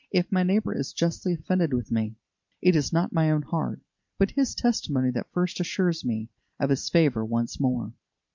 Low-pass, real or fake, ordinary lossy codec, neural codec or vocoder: 7.2 kHz; fake; MP3, 64 kbps; vocoder, 44.1 kHz, 128 mel bands every 512 samples, BigVGAN v2